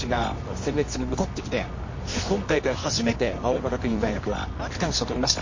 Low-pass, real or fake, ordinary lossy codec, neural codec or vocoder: 7.2 kHz; fake; MP3, 32 kbps; codec, 24 kHz, 0.9 kbps, WavTokenizer, medium music audio release